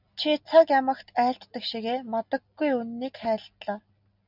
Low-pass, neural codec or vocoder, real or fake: 5.4 kHz; none; real